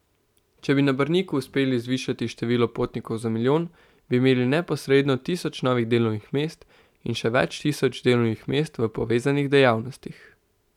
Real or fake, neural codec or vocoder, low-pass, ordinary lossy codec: real; none; 19.8 kHz; none